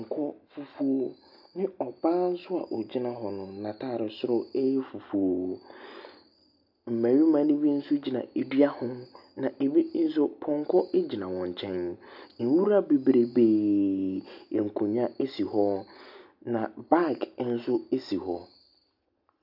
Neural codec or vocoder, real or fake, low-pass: none; real; 5.4 kHz